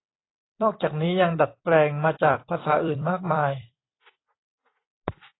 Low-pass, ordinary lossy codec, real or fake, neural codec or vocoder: 7.2 kHz; AAC, 16 kbps; fake; vocoder, 44.1 kHz, 128 mel bands every 256 samples, BigVGAN v2